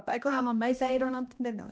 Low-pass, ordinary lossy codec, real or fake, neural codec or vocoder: none; none; fake; codec, 16 kHz, 1 kbps, X-Codec, HuBERT features, trained on balanced general audio